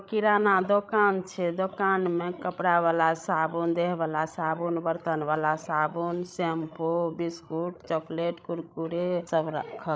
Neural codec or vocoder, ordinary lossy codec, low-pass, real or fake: codec, 16 kHz, 16 kbps, FreqCodec, larger model; none; none; fake